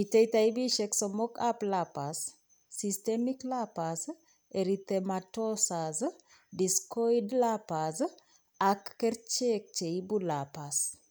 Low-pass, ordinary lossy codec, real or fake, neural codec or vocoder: none; none; real; none